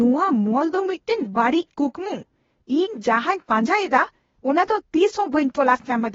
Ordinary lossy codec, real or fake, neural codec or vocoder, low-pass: AAC, 24 kbps; fake; codec, 16 kHz, 0.8 kbps, ZipCodec; 7.2 kHz